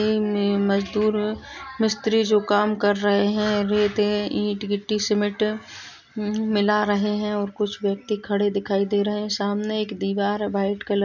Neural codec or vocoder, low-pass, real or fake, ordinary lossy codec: none; 7.2 kHz; real; none